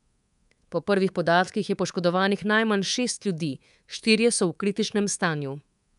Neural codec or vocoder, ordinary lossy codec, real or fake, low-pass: codec, 24 kHz, 3.1 kbps, DualCodec; none; fake; 10.8 kHz